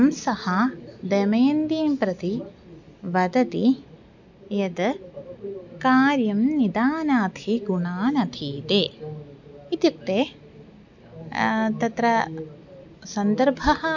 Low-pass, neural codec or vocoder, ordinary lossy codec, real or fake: 7.2 kHz; none; none; real